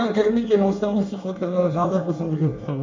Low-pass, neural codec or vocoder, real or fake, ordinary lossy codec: 7.2 kHz; codec, 24 kHz, 1 kbps, SNAC; fake; MP3, 64 kbps